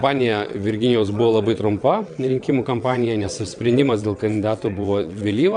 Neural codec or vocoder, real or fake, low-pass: vocoder, 22.05 kHz, 80 mel bands, WaveNeXt; fake; 9.9 kHz